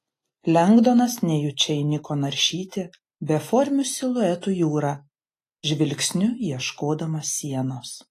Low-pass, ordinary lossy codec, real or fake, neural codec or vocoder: 14.4 kHz; AAC, 48 kbps; real; none